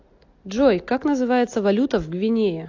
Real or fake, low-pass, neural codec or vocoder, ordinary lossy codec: real; 7.2 kHz; none; AAC, 48 kbps